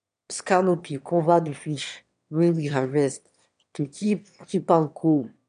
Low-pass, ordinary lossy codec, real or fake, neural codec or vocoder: 9.9 kHz; none; fake; autoencoder, 22.05 kHz, a latent of 192 numbers a frame, VITS, trained on one speaker